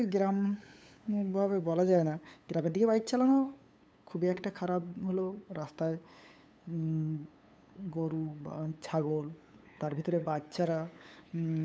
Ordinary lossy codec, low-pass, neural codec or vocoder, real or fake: none; none; codec, 16 kHz, 8 kbps, FunCodec, trained on LibriTTS, 25 frames a second; fake